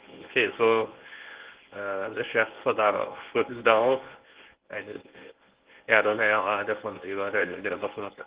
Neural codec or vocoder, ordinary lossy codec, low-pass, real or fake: codec, 24 kHz, 0.9 kbps, WavTokenizer, medium speech release version 1; Opus, 16 kbps; 3.6 kHz; fake